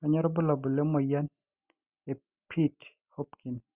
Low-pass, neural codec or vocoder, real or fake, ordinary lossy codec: 3.6 kHz; none; real; Opus, 64 kbps